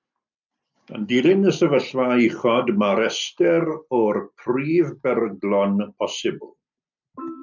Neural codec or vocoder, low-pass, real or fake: none; 7.2 kHz; real